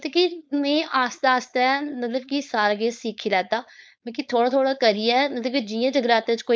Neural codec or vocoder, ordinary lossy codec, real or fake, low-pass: codec, 16 kHz, 4.8 kbps, FACodec; none; fake; none